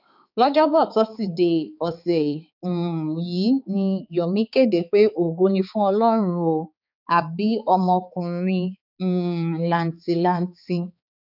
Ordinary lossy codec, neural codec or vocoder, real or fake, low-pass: none; codec, 16 kHz, 4 kbps, X-Codec, HuBERT features, trained on balanced general audio; fake; 5.4 kHz